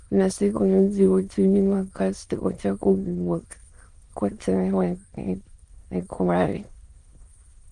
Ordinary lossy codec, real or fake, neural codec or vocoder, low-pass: Opus, 24 kbps; fake; autoencoder, 22.05 kHz, a latent of 192 numbers a frame, VITS, trained on many speakers; 9.9 kHz